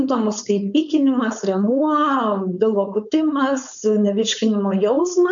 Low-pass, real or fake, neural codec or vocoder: 7.2 kHz; fake; codec, 16 kHz, 4.8 kbps, FACodec